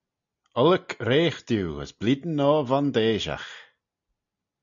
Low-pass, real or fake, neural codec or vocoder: 7.2 kHz; real; none